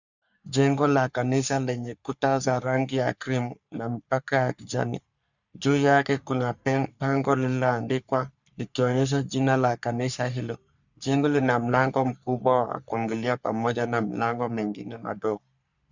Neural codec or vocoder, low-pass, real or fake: codec, 44.1 kHz, 3.4 kbps, Pupu-Codec; 7.2 kHz; fake